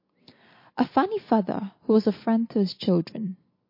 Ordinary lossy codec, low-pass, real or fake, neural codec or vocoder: MP3, 32 kbps; 5.4 kHz; real; none